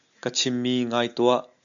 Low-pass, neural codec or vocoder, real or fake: 7.2 kHz; none; real